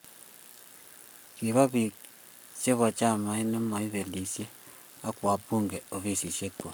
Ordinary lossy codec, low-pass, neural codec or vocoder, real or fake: none; none; codec, 44.1 kHz, 7.8 kbps, Pupu-Codec; fake